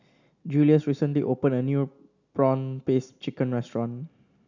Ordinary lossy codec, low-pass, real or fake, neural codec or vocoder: none; 7.2 kHz; real; none